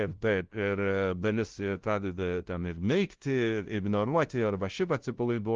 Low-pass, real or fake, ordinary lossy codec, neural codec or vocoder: 7.2 kHz; fake; Opus, 16 kbps; codec, 16 kHz, 0.5 kbps, FunCodec, trained on LibriTTS, 25 frames a second